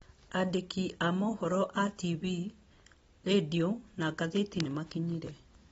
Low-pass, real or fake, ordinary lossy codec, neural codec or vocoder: 10.8 kHz; real; AAC, 24 kbps; none